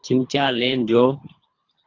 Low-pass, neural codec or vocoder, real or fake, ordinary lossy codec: 7.2 kHz; codec, 24 kHz, 3 kbps, HILCodec; fake; AAC, 32 kbps